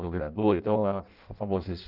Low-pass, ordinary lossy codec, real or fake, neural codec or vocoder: 5.4 kHz; Opus, 32 kbps; fake; codec, 16 kHz in and 24 kHz out, 0.6 kbps, FireRedTTS-2 codec